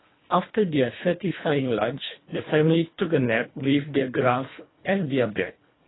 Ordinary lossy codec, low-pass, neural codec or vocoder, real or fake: AAC, 16 kbps; 7.2 kHz; codec, 24 kHz, 1.5 kbps, HILCodec; fake